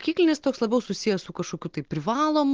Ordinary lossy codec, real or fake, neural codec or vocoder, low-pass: Opus, 16 kbps; real; none; 7.2 kHz